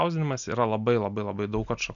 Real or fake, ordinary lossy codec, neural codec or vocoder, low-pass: real; MP3, 96 kbps; none; 7.2 kHz